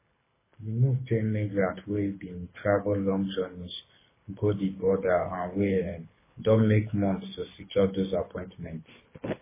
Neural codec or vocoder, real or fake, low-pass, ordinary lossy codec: codec, 24 kHz, 6 kbps, HILCodec; fake; 3.6 kHz; MP3, 16 kbps